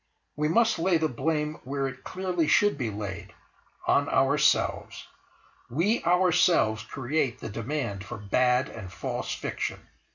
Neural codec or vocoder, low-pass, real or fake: none; 7.2 kHz; real